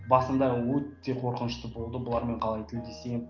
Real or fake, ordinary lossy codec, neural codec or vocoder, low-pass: real; Opus, 32 kbps; none; 7.2 kHz